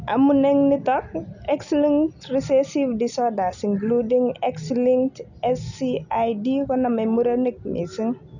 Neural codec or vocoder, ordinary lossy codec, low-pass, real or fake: none; none; 7.2 kHz; real